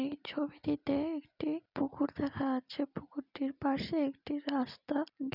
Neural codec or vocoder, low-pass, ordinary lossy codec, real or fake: none; 5.4 kHz; none; real